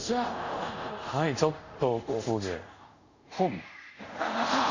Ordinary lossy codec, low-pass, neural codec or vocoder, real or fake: Opus, 64 kbps; 7.2 kHz; codec, 24 kHz, 0.5 kbps, DualCodec; fake